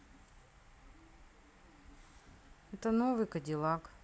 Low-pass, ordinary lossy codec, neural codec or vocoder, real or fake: none; none; none; real